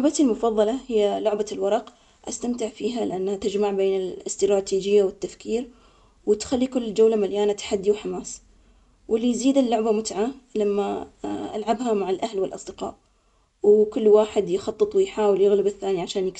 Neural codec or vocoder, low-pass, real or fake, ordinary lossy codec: none; 10.8 kHz; real; none